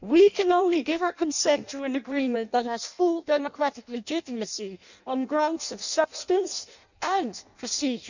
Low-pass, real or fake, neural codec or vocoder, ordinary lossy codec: 7.2 kHz; fake; codec, 16 kHz in and 24 kHz out, 0.6 kbps, FireRedTTS-2 codec; none